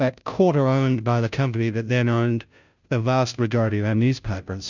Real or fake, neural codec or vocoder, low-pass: fake; codec, 16 kHz, 0.5 kbps, FunCodec, trained on Chinese and English, 25 frames a second; 7.2 kHz